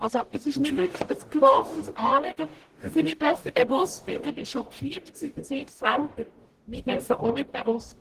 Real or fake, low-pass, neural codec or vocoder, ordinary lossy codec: fake; 14.4 kHz; codec, 44.1 kHz, 0.9 kbps, DAC; Opus, 16 kbps